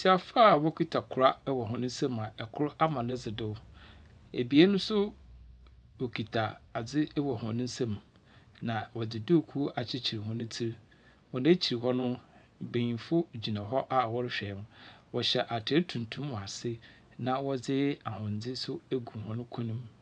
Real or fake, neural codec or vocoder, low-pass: fake; vocoder, 24 kHz, 100 mel bands, Vocos; 9.9 kHz